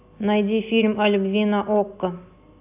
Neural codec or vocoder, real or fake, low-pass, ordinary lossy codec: none; real; 3.6 kHz; AAC, 32 kbps